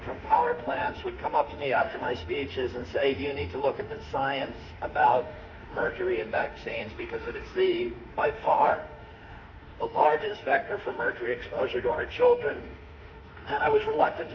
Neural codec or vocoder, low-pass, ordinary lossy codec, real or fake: autoencoder, 48 kHz, 32 numbers a frame, DAC-VAE, trained on Japanese speech; 7.2 kHz; AAC, 48 kbps; fake